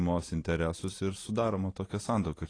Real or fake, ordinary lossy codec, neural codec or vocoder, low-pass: real; AAC, 32 kbps; none; 9.9 kHz